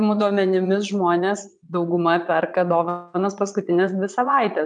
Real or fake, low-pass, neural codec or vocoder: fake; 9.9 kHz; vocoder, 22.05 kHz, 80 mel bands, Vocos